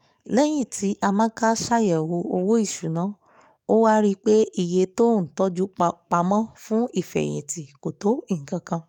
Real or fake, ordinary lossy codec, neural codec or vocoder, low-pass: fake; none; codec, 44.1 kHz, 7.8 kbps, DAC; 19.8 kHz